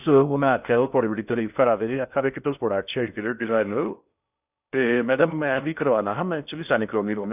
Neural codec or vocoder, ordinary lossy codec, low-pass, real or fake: codec, 16 kHz in and 24 kHz out, 0.6 kbps, FocalCodec, streaming, 4096 codes; none; 3.6 kHz; fake